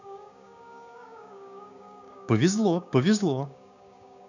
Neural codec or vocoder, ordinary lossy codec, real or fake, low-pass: codec, 16 kHz, 6 kbps, DAC; none; fake; 7.2 kHz